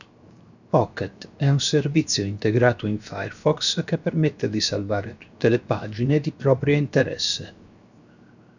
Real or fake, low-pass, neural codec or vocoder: fake; 7.2 kHz; codec, 16 kHz, 0.7 kbps, FocalCodec